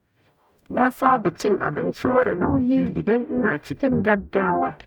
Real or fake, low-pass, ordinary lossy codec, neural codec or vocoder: fake; 19.8 kHz; none; codec, 44.1 kHz, 0.9 kbps, DAC